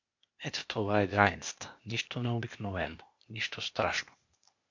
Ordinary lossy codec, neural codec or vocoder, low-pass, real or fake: MP3, 48 kbps; codec, 16 kHz, 0.8 kbps, ZipCodec; 7.2 kHz; fake